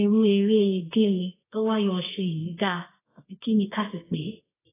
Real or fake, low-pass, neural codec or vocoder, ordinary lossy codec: fake; 3.6 kHz; codec, 24 kHz, 0.9 kbps, WavTokenizer, medium music audio release; AAC, 16 kbps